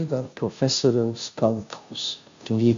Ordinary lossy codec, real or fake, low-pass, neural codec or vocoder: AAC, 64 kbps; fake; 7.2 kHz; codec, 16 kHz, 0.5 kbps, FunCodec, trained on Chinese and English, 25 frames a second